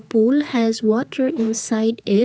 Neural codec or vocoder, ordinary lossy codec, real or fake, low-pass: codec, 16 kHz, 4 kbps, X-Codec, HuBERT features, trained on general audio; none; fake; none